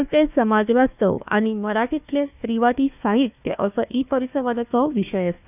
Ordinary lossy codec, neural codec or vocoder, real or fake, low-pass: none; codec, 16 kHz, 1 kbps, FunCodec, trained on Chinese and English, 50 frames a second; fake; 3.6 kHz